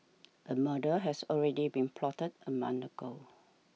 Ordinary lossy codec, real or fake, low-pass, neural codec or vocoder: none; real; none; none